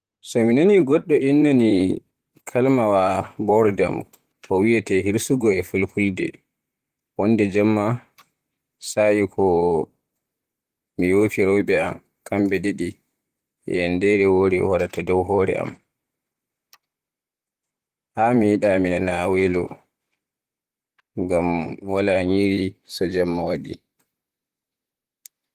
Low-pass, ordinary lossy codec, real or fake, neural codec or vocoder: 14.4 kHz; Opus, 24 kbps; fake; vocoder, 44.1 kHz, 128 mel bands, Pupu-Vocoder